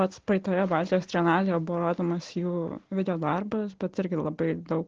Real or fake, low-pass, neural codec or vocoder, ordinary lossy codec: real; 7.2 kHz; none; Opus, 16 kbps